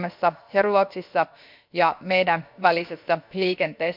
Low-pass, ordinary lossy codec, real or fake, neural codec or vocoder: 5.4 kHz; MP3, 48 kbps; fake; codec, 16 kHz, about 1 kbps, DyCAST, with the encoder's durations